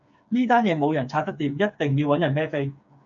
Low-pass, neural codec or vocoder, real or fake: 7.2 kHz; codec, 16 kHz, 4 kbps, FreqCodec, smaller model; fake